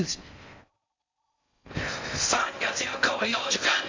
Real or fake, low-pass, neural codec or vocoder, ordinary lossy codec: fake; 7.2 kHz; codec, 16 kHz in and 24 kHz out, 0.6 kbps, FocalCodec, streaming, 4096 codes; MP3, 64 kbps